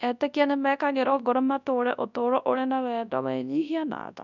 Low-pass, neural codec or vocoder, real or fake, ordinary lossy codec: 7.2 kHz; codec, 24 kHz, 0.9 kbps, WavTokenizer, large speech release; fake; none